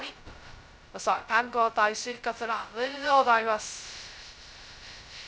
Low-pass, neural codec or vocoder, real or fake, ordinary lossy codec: none; codec, 16 kHz, 0.2 kbps, FocalCodec; fake; none